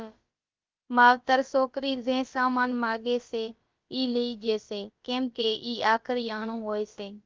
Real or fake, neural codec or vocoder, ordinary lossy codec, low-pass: fake; codec, 16 kHz, about 1 kbps, DyCAST, with the encoder's durations; Opus, 32 kbps; 7.2 kHz